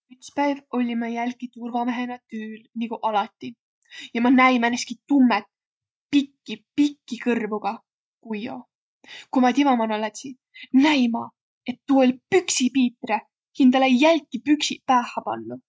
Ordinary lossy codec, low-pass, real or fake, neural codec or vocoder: none; none; real; none